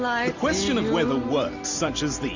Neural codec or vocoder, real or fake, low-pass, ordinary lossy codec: none; real; 7.2 kHz; Opus, 64 kbps